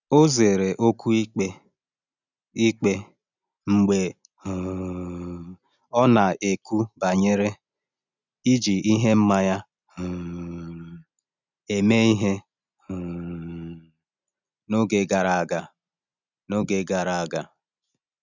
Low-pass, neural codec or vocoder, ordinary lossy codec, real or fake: 7.2 kHz; none; none; real